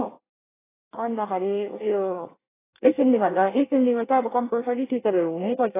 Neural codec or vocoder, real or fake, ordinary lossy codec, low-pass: codec, 24 kHz, 1 kbps, SNAC; fake; AAC, 16 kbps; 3.6 kHz